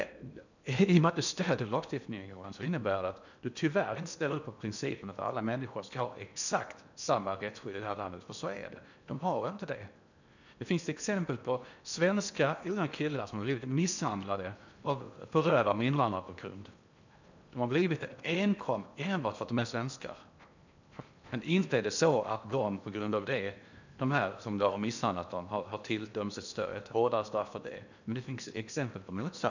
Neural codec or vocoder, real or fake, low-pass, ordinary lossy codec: codec, 16 kHz in and 24 kHz out, 0.8 kbps, FocalCodec, streaming, 65536 codes; fake; 7.2 kHz; none